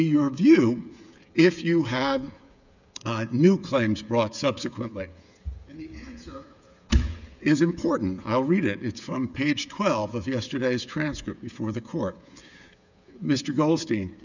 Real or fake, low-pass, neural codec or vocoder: fake; 7.2 kHz; codec, 16 kHz, 8 kbps, FreqCodec, smaller model